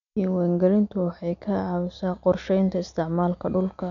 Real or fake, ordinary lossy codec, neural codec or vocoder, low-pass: real; none; none; 7.2 kHz